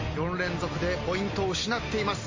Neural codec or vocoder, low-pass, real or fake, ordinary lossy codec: none; 7.2 kHz; real; MP3, 32 kbps